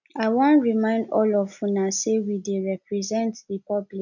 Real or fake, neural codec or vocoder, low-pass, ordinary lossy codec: real; none; 7.2 kHz; none